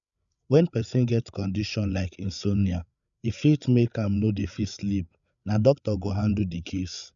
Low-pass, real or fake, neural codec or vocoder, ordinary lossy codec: 7.2 kHz; fake; codec, 16 kHz, 8 kbps, FreqCodec, larger model; none